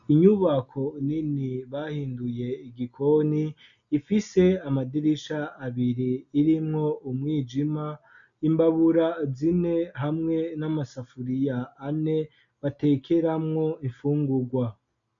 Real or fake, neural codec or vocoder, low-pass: real; none; 7.2 kHz